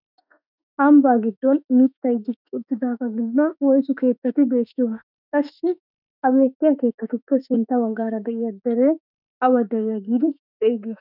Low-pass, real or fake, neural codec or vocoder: 5.4 kHz; fake; autoencoder, 48 kHz, 32 numbers a frame, DAC-VAE, trained on Japanese speech